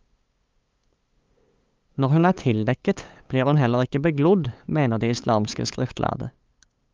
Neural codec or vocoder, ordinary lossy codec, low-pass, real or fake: codec, 16 kHz, 8 kbps, FunCodec, trained on LibriTTS, 25 frames a second; Opus, 32 kbps; 7.2 kHz; fake